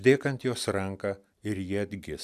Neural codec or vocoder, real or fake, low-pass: none; real; 14.4 kHz